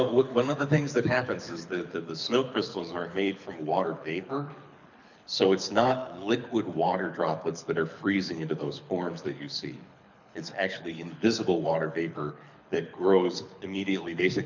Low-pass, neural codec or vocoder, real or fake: 7.2 kHz; codec, 24 kHz, 6 kbps, HILCodec; fake